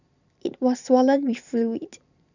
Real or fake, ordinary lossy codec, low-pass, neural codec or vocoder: fake; none; 7.2 kHz; vocoder, 44.1 kHz, 128 mel bands every 512 samples, BigVGAN v2